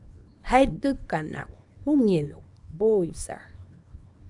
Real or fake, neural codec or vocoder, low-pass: fake; codec, 24 kHz, 0.9 kbps, WavTokenizer, small release; 10.8 kHz